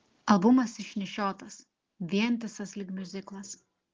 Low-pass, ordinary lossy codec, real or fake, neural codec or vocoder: 7.2 kHz; Opus, 16 kbps; real; none